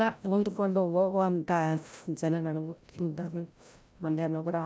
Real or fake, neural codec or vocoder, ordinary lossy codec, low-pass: fake; codec, 16 kHz, 0.5 kbps, FreqCodec, larger model; none; none